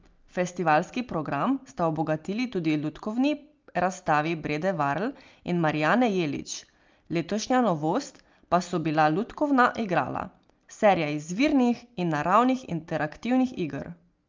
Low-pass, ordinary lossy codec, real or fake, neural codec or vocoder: 7.2 kHz; Opus, 24 kbps; real; none